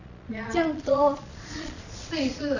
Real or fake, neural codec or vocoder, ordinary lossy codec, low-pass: fake; vocoder, 22.05 kHz, 80 mel bands, Vocos; none; 7.2 kHz